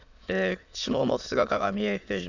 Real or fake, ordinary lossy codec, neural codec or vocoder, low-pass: fake; none; autoencoder, 22.05 kHz, a latent of 192 numbers a frame, VITS, trained on many speakers; 7.2 kHz